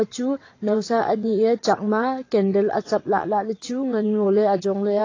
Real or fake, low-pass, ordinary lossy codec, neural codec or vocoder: fake; 7.2 kHz; AAC, 32 kbps; vocoder, 22.05 kHz, 80 mel bands, WaveNeXt